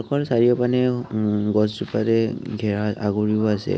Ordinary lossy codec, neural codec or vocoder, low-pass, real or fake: none; none; none; real